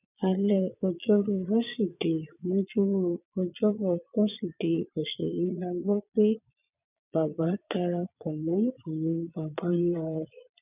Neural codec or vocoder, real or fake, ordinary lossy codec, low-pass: vocoder, 22.05 kHz, 80 mel bands, Vocos; fake; none; 3.6 kHz